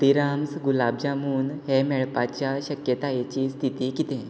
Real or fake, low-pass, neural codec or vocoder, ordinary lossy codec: real; none; none; none